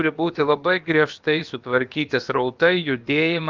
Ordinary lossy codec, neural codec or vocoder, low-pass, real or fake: Opus, 24 kbps; codec, 16 kHz, about 1 kbps, DyCAST, with the encoder's durations; 7.2 kHz; fake